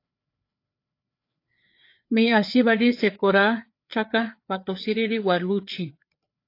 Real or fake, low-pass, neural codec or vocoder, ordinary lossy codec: fake; 5.4 kHz; codec, 16 kHz, 4 kbps, FreqCodec, larger model; AAC, 32 kbps